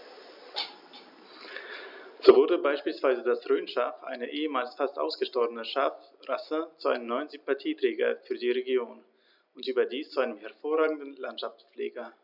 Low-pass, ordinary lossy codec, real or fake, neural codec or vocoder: 5.4 kHz; none; real; none